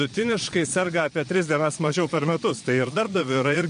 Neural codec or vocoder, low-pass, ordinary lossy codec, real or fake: vocoder, 22.05 kHz, 80 mel bands, Vocos; 9.9 kHz; MP3, 48 kbps; fake